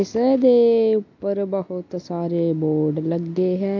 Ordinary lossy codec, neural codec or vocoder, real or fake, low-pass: AAC, 48 kbps; none; real; 7.2 kHz